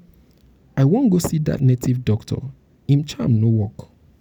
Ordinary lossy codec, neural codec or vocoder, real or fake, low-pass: none; none; real; 19.8 kHz